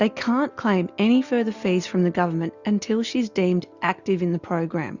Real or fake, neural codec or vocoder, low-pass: real; none; 7.2 kHz